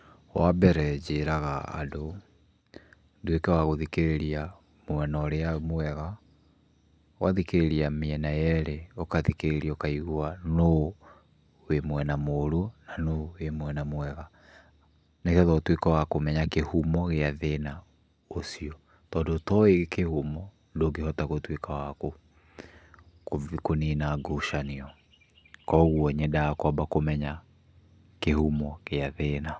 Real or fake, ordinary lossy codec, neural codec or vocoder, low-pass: real; none; none; none